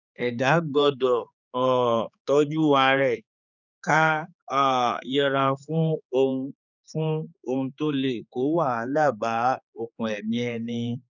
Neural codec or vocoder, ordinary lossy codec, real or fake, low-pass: codec, 16 kHz, 4 kbps, X-Codec, HuBERT features, trained on general audio; none; fake; 7.2 kHz